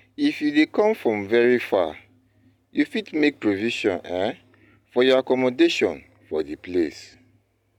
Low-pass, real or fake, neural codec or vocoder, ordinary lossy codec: 19.8 kHz; real; none; none